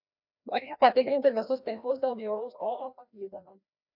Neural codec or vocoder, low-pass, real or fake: codec, 16 kHz, 1 kbps, FreqCodec, larger model; 5.4 kHz; fake